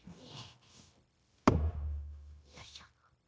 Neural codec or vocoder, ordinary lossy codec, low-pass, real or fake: codec, 16 kHz, 0.9 kbps, LongCat-Audio-Codec; none; none; fake